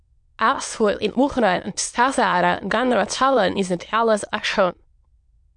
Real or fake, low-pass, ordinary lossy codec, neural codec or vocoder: fake; 9.9 kHz; MP3, 64 kbps; autoencoder, 22.05 kHz, a latent of 192 numbers a frame, VITS, trained on many speakers